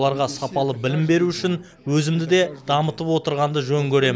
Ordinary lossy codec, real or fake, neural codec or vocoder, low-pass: none; real; none; none